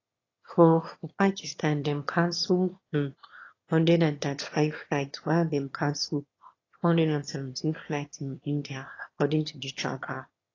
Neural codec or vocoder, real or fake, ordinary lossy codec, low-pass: autoencoder, 22.05 kHz, a latent of 192 numbers a frame, VITS, trained on one speaker; fake; AAC, 32 kbps; 7.2 kHz